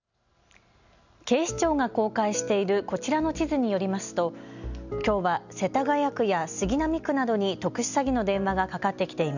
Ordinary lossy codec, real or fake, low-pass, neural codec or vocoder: none; real; 7.2 kHz; none